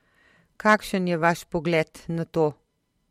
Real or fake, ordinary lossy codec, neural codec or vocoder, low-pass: fake; MP3, 64 kbps; vocoder, 44.1 kHz, 128 mel bands every 512 samples, BigVGAN v2; 19.8 kHz